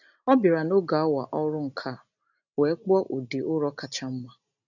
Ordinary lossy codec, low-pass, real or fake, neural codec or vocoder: none; 7.2 kHz; real; none